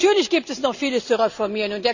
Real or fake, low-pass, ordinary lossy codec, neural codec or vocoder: real; 7.2 kHz; none; none